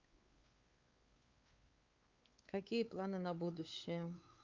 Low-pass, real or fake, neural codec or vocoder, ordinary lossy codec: 7.2 kHz; fake; codec, 16 kHz, 4 kbps, X-Codec, WavLM features, trained on Multilingual LibriSpeech; Opus, 24 kbps